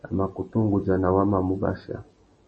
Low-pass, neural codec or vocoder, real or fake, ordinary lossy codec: 10.8 kHz; none; real; MP3, 32 kbps